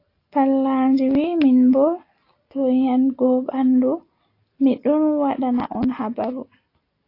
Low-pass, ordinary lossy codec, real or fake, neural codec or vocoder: 5.4 kHz; AAC, 32 kbps; real; none